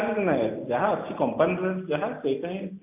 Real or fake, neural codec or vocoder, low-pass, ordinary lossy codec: real; none; 3.6 kHz; AAC, 32 kbps